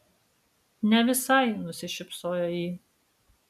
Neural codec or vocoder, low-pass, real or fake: none; 14.4 kHz; real